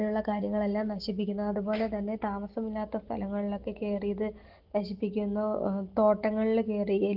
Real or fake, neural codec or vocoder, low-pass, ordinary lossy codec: real; none; 5.4 kHz; Opus, 16 kbps